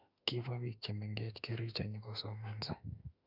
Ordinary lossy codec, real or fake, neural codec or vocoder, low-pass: none; fake; autoencoder, 48 kHz, 32 numbers a frame, DAC-VAE, trained on Japanese speech; 5.4 kHz